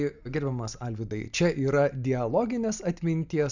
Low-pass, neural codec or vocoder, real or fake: 7.2 kHz; none; real